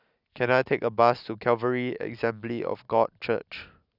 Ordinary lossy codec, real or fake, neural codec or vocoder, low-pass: none; real; none; 5.4 kHz